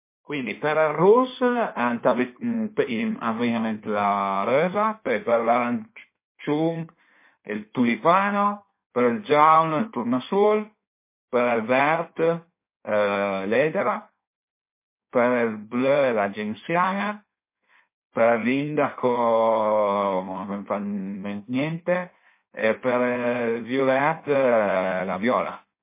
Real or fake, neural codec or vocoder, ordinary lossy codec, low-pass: fake; codec, 16 kHz in and 24 kHz out, 1.1 kbps, FireRedTTS-2 codec; MP3, 24 kbps; 3.6 kHz